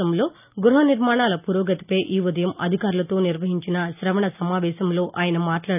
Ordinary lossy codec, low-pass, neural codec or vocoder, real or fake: none; 3.6 kHz; none; real